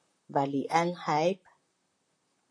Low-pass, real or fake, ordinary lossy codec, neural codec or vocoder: 9.9 kHz; fake; AAC, 48 kbps; vocoder, 44.1 kHz, 128 mel bands, Pupu-Vocoder